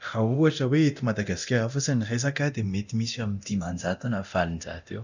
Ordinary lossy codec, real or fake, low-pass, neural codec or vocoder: none; fake; 7.2 kHz; codec, 24 kHz, 0.9 kbps, DualCodec